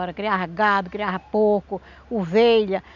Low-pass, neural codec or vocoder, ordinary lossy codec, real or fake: 7.2 kHz; none; none; real